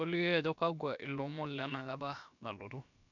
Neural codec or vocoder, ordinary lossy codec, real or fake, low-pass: codec, 16 kHz, about 1 kbps, DyCAST, with the encoder's durations; none; fake; 7.2 kHz